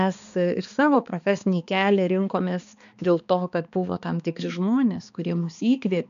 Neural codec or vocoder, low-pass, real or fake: codec, 16 kHz, 2 kbps, X-Codec, HuBERT features, trained on balanced general audio; 7.2 kHz; fake